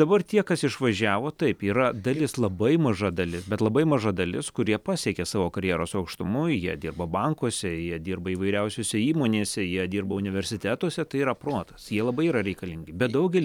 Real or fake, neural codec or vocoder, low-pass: real; none; 19.8 kHz